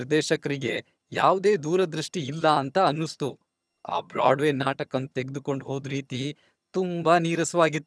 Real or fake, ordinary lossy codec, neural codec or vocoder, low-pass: fake; none; vocoder, 22.05 kHz, 80 mel bands, HiFi-GAN; none